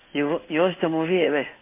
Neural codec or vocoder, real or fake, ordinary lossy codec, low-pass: codec, 16 kHz in and 24 kHz out, 1 kbps, XY-Tokenizer; fake; MP3, 32 kbps; 3.6 kHz